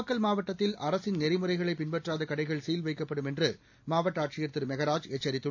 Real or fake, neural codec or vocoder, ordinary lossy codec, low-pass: real; none; AAC, 48 kbps; 7.2 kHz